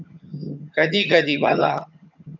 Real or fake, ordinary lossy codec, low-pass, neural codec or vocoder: fake; MP3, 64 kbps; 7.2 kHz; vocoder, 22.05 kHz, 80 mel bands, HiFi-GAN